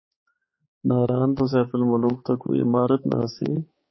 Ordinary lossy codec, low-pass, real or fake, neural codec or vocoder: MP3, 24 kbps; 7.2 kHz; fake; codec, 16 kHz, 4 kbps, X-Codec, HuBERT features, trained on balanced general audio